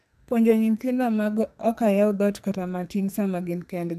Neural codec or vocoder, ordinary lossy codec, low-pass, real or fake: codec, 44.1 kHz, 2.6 kbps, SNAC; none; 14.4 kHz; fake